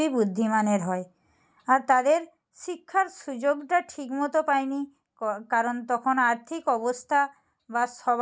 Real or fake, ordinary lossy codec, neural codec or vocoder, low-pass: real; none; none; none